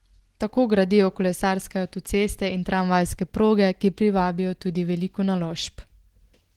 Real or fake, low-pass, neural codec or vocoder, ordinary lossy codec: real; 19.8 kHz; none; Opus, 16 kbps